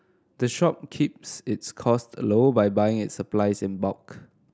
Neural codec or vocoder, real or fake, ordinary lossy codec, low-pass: none; real; none; none